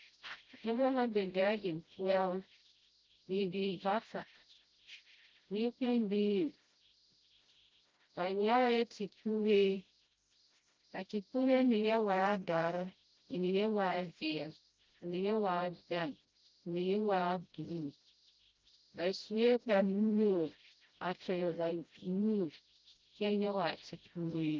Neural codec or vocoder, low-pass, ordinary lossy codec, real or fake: codec, 16 kHz, 0.5 kbps, FreqCodec, smaller model; 7.2 kHz; Opus, 32 kbps; fake